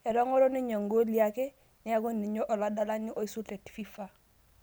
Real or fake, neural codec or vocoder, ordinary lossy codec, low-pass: real; none; none; none